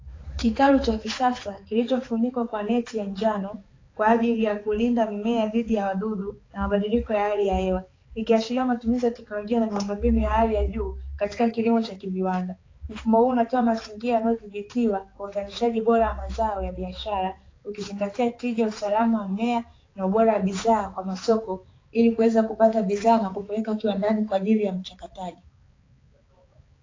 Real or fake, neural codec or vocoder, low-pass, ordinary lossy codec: fake; codec, 16 kHz, 4 kbps, X-Codec, HuBERT features, trained on balanced general audio; 7.2 kHz; AAC, 32 kbps